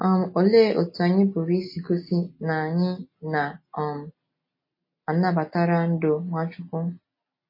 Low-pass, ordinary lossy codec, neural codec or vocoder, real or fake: 5.4 kHz; MP3, 24 kbps; none; real